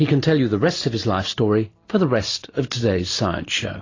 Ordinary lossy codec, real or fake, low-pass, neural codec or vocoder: AAC, 32 kbps; real; 7.2 kHz; none